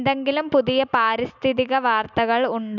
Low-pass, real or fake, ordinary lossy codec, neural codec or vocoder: 7.2 kHz; real; none; none